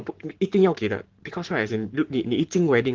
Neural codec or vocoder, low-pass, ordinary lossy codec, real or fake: codec, 44.1 kHz, 7.8 kbps, Pupu-Codec; 7.2 kHz; Opus, 16 kbps; fake